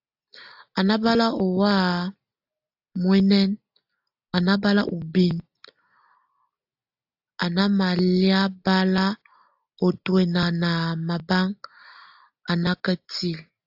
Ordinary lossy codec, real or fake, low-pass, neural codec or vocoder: AAC, 48 kbps; real; 5.4 kHz; none